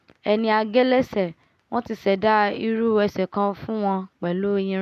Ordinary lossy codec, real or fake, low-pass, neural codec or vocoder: none; real; 14.4 kHz; none